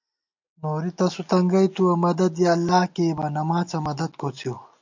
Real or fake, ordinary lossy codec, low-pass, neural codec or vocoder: real; MP3, 64 kbps; 7.2 kHz; none